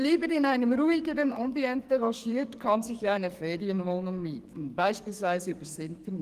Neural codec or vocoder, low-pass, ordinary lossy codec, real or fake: codec, 44.1 kHz, 2.6 kbps, SNAC; 14.4 kHz; Opus, 24 kbps; fake